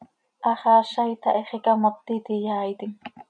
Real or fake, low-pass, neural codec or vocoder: real; 9.9 kHz; none